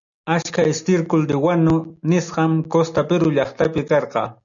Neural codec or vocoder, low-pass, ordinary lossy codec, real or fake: none; 7.2 kHz; MP3, 96 kbps; real